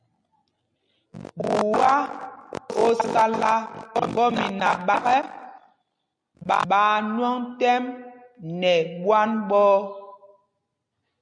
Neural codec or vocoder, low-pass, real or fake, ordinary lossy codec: none; 9.9 kHz; real; MP3, 64 kbps